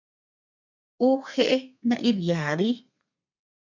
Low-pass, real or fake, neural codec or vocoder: 7.2 kHz; fake; codec, 32 kHz, 1.9 kbps, SNAC